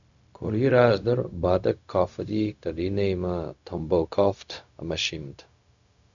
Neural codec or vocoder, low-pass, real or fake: codec, 16 kHz, 0.4 kbps, LongCat-Audio-Codec; 7.2 kHz; fake